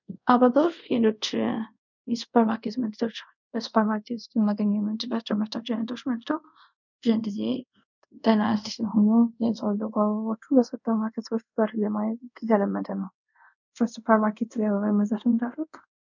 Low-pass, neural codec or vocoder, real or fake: 7.2 kHz; codec, 24 kHz, 0.5 kbps, DualCodec; fake